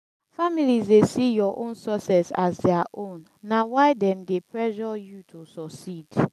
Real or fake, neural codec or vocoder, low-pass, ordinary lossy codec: real; none; 14.4 kHz; none